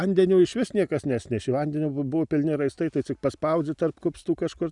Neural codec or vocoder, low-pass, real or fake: none; 10.8 kHz; real